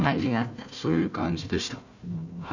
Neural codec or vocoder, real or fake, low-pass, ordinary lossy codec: codec, 16 kHz, 1 kbps, FunCodec, trained on Chinese and English, 50 frames a second; fake; 7.2 kHz; none